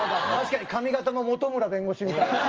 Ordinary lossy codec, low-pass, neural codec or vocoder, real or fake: Opus, 24 kbps; 7.2 kHz; none; real